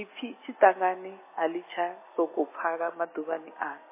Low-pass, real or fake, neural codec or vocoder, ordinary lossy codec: 3.6 kHz; real; none; MP3, 16 kbps